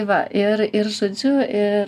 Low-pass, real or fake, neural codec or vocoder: 14.4 kHz; real; none